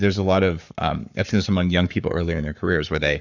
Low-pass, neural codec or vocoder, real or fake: 7.2 kHz; codec, 44.1 kHz, 7.8 kbps, Pupu-Codec; fake